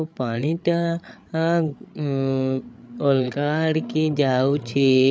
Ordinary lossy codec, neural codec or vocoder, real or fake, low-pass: none; codec, 16 kHz, 8 kbps, FreqCodec, larger model; fake; none